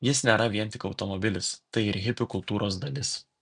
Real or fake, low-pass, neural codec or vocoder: real; 9.9 kHz; none